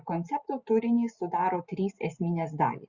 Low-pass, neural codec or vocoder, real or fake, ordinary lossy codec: 7.2 kHz; none; real; Opus, 64 kbps